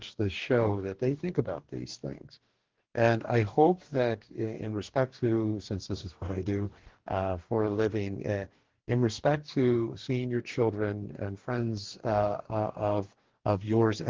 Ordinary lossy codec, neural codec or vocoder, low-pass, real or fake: Opus, 16 kbps; codec, 44.1 kHz, 2.6 kbps, DAC; 7.2 kHz; fake